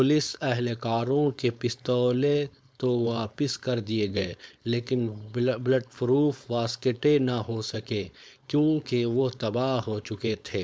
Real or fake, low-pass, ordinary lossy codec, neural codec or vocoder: fake; none; none; codec, 16 kHz, 4.8 kbps, FACodec